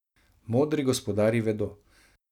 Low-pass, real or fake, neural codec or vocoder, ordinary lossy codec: 19.8 kHz; real; none; none